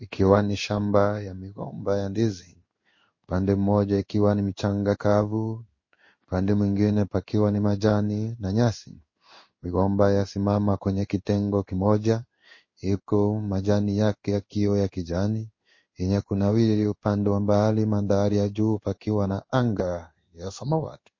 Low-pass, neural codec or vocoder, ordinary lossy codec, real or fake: 7.2 kHz; codec, 16 kHz in and 24 kHz out, 1 kbps, XY-Tokenizer; MP3, 32 kbps; fake